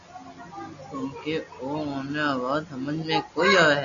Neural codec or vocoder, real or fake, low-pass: none; real; 7.2 kHz